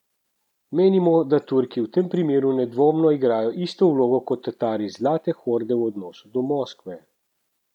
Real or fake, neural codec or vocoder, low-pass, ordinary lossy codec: real; none; 19.8 kHz; none